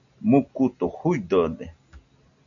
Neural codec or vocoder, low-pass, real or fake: none; 7.2 kHz; real